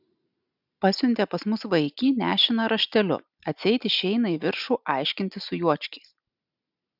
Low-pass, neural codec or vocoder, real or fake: 5.4 kHz; none; real